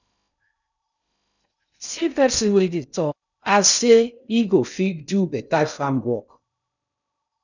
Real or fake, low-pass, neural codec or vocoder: fake; 7.2 kHz; codec, 16 kHz in and 24 kHz out, 0.6 kbps, FocalCodec, streaming, 2048 codes